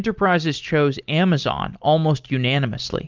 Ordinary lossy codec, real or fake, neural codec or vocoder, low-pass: Opus, 32 kbps; real; none; 7.2 kHz